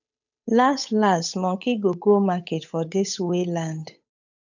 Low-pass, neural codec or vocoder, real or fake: 7.2 kHz; codec, 16 kHz, 8 kbps, FunCodec, trained on Chinese and English, 25 frames a second; fake